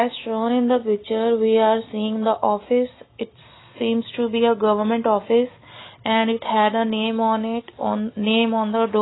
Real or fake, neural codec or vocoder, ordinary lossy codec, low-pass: real; none; AAC, 16 kbps; 7.2 kHz